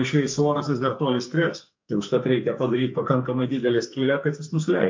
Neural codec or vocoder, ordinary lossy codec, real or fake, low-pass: codec, 44.1 kHz, 2.6 kbps, SNAC; MP3, 48 kbps; fake; 7.2 kHz